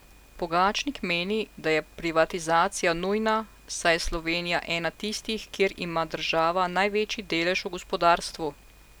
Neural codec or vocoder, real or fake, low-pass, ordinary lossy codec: none; real; none; none